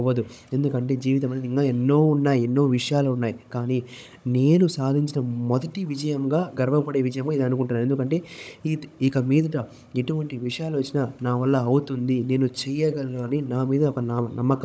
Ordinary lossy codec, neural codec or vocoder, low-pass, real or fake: none; codec, 16 kHz, 16 kbps, FunCodec, trained on Chinese and English, 50 frames a second; none; fake